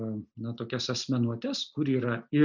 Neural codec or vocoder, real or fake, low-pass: none; real; 7.2 kHz